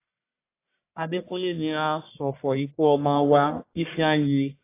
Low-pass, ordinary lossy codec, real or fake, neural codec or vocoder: 3.6 kHz; AAC, 24 kbps; fake; codec, 44.1 kHz, 1.7 kbps, Pupu-Codec